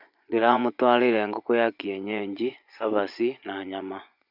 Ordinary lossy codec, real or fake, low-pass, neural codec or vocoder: none; fake; 5.4 kHz; vocoder, 24 kHz, 100 mel bands, Vocos